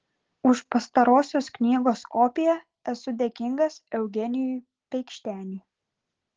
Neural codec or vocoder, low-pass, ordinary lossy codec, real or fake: none; 7.2 kHz; Opus, 16 kbps; real